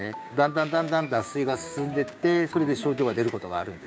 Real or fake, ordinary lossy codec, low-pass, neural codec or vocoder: fake; none; none; codec, 16 kHz, 6 kbps, DAC